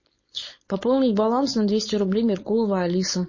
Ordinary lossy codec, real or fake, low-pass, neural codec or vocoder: MP3, 32 kbps; fake; 7.2 kHz; codec, 16 kHz, 4.8 kbps, FACodec